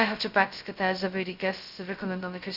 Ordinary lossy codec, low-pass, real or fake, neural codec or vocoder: Opus, 64 kbps; 5.4 kHz; fake; codec, 16 kHz, 0.2 kbps, FocalCodec